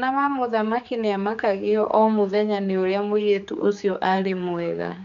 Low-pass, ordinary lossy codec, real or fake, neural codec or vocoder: 7.2 kHz; none; fake; codec, 16 kHz, 4 kbps, X-Codec, HuBERT features, trained on general audio